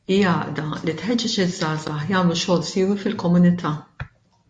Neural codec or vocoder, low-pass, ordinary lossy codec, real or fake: none; 9.9 kHz; MP3, 48 kbps; real